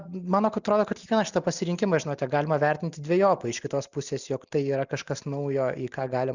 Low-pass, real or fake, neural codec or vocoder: 7.2 kHz; real; none